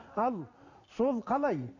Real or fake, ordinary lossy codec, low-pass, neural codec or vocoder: real; none; 7.2 kHz; none